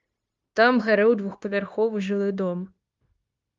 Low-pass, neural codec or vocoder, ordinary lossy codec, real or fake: 7.2 kHz; codec, 16 kHz, 0.9 kbps, LongCat-Audio-Codec; Opus, 32 kbps; fake